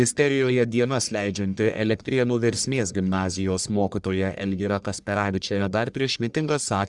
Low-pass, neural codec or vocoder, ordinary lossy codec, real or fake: 10.8 kHz; codec, 44.1 kHz, 1.7 kbps, Pupu-Codec; Opus, 64 kbps; fake